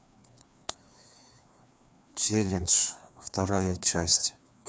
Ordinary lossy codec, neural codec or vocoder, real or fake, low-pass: none; codec, 16 kHz, 2 kbps, FreqCodec, larger model; fake; none